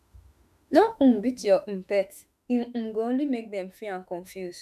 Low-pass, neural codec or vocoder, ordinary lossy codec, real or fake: 14.4 kHz; autoencoder, 48 kHz, 32 numbers a frame, DAC-VAE, trained on Japanese speech; none; fake